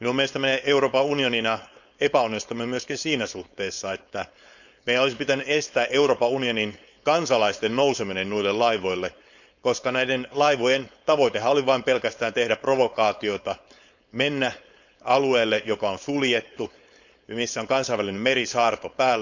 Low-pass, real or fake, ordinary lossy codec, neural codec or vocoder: 7.2 kHz; fake; none; codec, 16 kHz, 4.8 kbps, FACodec